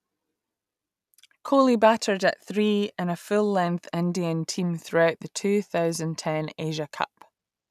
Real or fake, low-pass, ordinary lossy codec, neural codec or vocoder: real; 14.4 kHz; none; none